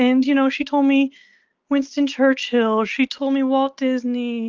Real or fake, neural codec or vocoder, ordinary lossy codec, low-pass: real; none; Opus, 24 kbps; 7.2 kHz